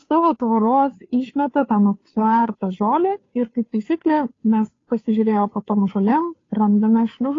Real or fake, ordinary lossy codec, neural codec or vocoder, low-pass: fake; AAC, 32 kbps; codec, 16 kHz, 2 kbps, FunCodec, trained on Chinese and English, 25 frames a second; 7.2 kHz